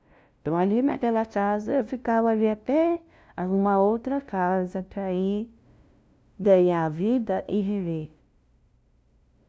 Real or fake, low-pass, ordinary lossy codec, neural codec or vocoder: fake; none; none; codec, 16 kHz, 0.5 kbps, FunCodec, trained on LibriTTS, 25 frames a second